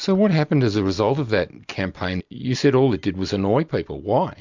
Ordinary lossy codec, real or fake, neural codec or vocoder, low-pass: MP3, 64 kbps; real; none; 7.2 kHz